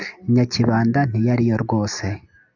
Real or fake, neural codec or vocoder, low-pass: real; none; 7.2 kHz